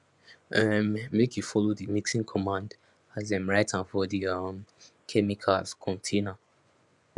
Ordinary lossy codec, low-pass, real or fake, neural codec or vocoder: none; 10.8 kHz; fake; vocoder, 24 kHz, 100 mel bands, Vocos